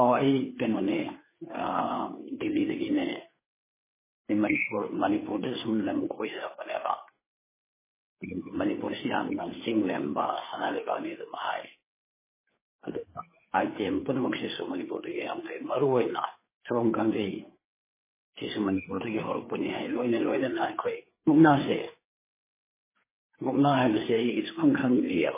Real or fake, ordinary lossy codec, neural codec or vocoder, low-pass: fake; MP3, 16 kbps; codec, 16 kHz, 4 kbps, FreqCodec, larger model; 3.6 kHz